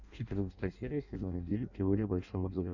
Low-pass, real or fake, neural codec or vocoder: 7.2 kHz; fake; codec, 16 kHz in and 24 kHz out, 0.6 kbps, FireRedTTS-2 codec